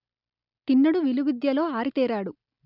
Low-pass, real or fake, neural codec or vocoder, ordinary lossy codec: 5.4 kHz; real; none; none